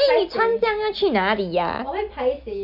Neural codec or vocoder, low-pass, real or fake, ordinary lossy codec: none; 5.4 kHz; real; none